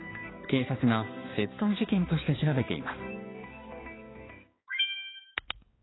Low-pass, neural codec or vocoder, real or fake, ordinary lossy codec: 7.2 kHz; codec, 16 kHz, 2 kbps, X-Codec, HuBERT features, trained on balanced general audio; fake; AAC, 16 kbps